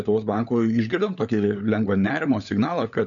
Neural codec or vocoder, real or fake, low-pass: codec, 16 kHz, 16 kbps, FunCodec, trained on LibriTTS, 50 frames a second; fake; 7.2 kHz